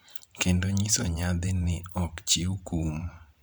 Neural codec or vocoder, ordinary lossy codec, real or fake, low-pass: vocoder, 44.1 kHz, 128 mel bands every 512 samples, BigVGAN v2; none; fake; none